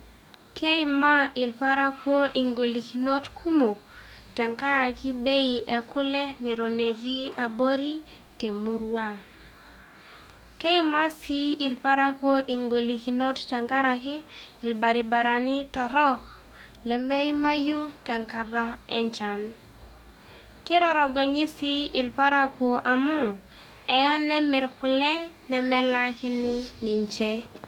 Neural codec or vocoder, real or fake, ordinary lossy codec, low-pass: codec, 44.1 kHz, 2.6 kbps, DAC; fake; none; 19.8 kHz